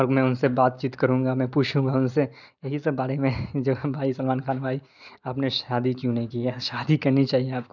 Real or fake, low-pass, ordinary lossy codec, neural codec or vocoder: real; 7.2 kHz; none; none